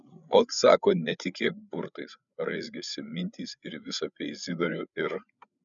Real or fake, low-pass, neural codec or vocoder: fake; 7.2 kHz; codec, 16 kHz, 8 kbps, FreqCodec, larger model